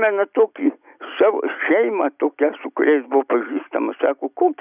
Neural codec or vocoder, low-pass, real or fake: none; 3.6 kHz; real